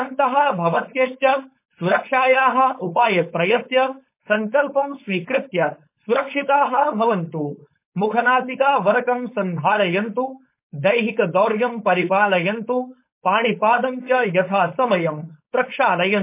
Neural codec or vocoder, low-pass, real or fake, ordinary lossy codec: codec, 16 kHz, 4.8 kbps, FACodec; 3.6 kHz; fake; MP3, 24 kbps